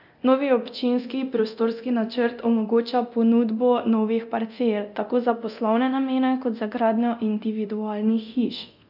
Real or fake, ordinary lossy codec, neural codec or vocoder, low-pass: fake; none; codec, 24 kHz, 0.9 kbps, DualCodec; 5.4 kHz